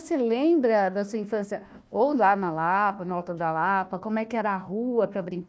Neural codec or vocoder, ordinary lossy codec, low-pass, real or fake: codec, 16 kHz, 1 kbps, FunCodec, trained on Chinese and English, 50 frames a second; none; none; fake